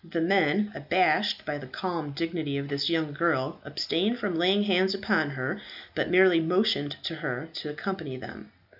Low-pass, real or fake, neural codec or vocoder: 5.4 kHz; real; none